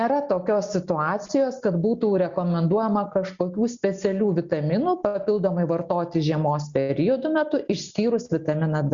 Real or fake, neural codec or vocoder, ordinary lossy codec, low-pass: real; none; Opus, 64 kbps; 7.2 kHz